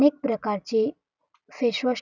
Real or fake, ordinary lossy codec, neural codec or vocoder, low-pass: real; none; none; 7.2 kHz